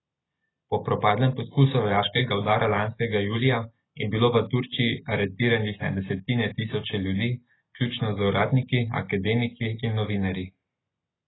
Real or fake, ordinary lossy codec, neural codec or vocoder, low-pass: real; AAC, 16 kbps; none; 7.2 kHz